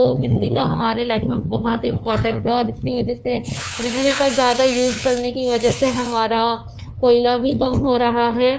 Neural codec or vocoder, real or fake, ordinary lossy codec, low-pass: codec, 16 kHz, 2 kbps, FunCodec, trained on LibriTTS, 25 frames a second; fake; none; none